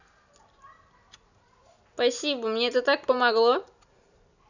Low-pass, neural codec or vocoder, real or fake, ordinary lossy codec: 7.2 kHz; none; real; none